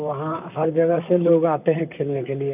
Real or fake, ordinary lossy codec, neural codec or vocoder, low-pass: fake; none; vocoder, 44.1 kHz, 128 mel bands, Pupu-Vocoder; 3.6 kHz